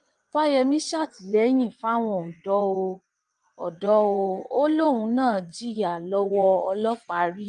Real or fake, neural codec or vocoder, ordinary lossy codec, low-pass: fake; vocoder, 22.05 kHz, 80 mel bands, WaveNeXt; Opus, 32 kbps; 9.9 kHz